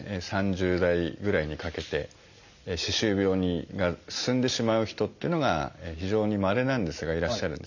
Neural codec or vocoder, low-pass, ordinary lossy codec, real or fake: none; 7.2 kHz; none; real